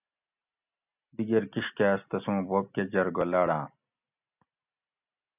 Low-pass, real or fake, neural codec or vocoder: 3.6 kHz; real; none